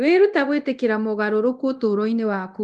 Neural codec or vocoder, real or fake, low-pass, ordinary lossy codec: codec, 24 kHz, 0.9 kbps, DualCodec; fake; 10.8 kHz; Opus, 32 kbps